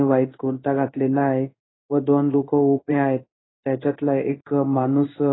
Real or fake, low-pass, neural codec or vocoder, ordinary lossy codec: fake; 7.2 kHz; codec, 16 kHz in and 24 kHz out, 1 kbps, XY-Tokenizer; AAC, 16 kbps